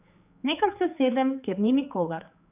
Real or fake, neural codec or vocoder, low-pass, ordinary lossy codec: fake; codec, 16 kHz, 4 kbps, X-Codec, HuBERT features, trained on general audio; 3.6 kHz; none